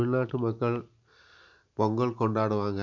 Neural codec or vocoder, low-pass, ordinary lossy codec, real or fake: none; 7.2 kHz; none; real